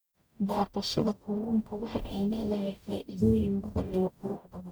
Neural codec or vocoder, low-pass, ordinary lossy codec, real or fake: codec, 44.1 kHz, 0.9 kbps, DAC; none; none; fake